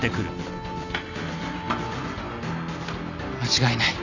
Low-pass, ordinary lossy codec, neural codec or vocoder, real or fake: 7.2 kHz; none; none; real